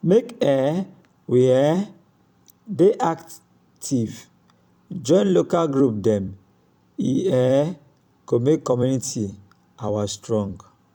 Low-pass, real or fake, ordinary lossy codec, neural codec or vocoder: 19.8 kHz; fake; none; vocoder, 44.1 kHz, 128 mel bands every 256 samples, BigVGAN v2